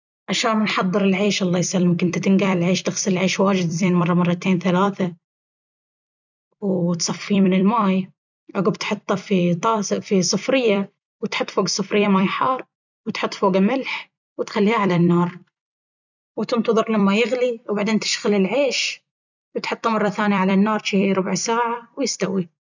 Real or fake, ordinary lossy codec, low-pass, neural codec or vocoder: fake; none; 7.2 kHz; vocoder, 44.1 kHz, 128 mel bands every 256 samples, BigVGAN v2